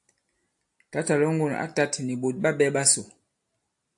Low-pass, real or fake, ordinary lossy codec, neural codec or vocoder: 10.8 kHz; real; AAC, 64 kbps; none